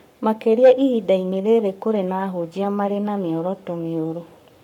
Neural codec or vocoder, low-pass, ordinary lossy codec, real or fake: codec, 44.1 kHz, 7.8 kbps, Pupu-Codec; 19.8 kHz; none; fake